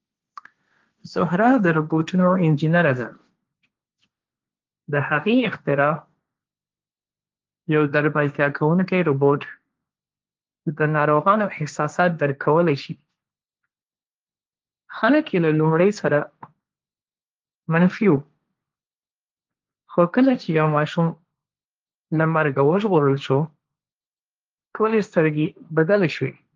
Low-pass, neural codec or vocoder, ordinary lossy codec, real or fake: 7.2 kHz; codec, 16 kHz, 1.1 kbps, Voila-Tokenizer; Opus, 32 kbps; fake